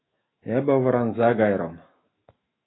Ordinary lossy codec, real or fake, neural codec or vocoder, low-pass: AAC, 16 kbps; fake; autoencoder, 48 kHz, 128 numbers a frame, DAC-VAE, trained on Japanese speech; 7.2 kHz